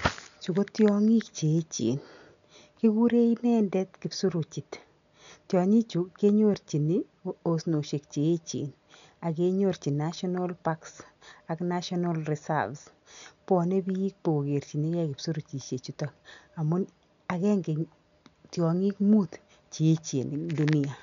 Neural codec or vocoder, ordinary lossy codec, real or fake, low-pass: none; none; real; 7.2 kHz